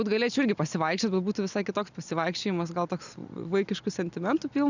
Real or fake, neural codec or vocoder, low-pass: real; none; 7.2 kHz